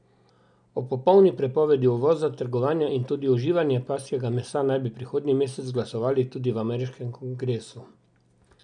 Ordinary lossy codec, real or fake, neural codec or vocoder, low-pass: none; real; none; 9.9 kHz